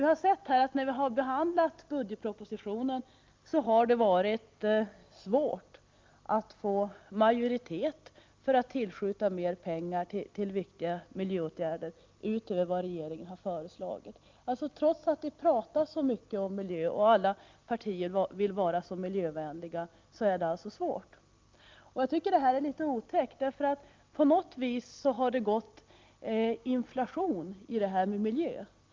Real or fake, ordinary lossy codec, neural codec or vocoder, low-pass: real; Opus, 32 kbps; none; 7.2 kHz